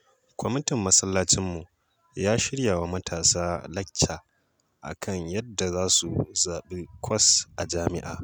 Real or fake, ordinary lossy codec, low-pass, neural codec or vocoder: real; none; none; none